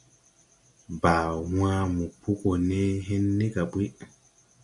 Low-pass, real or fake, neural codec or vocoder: 10.8 kHz; real; none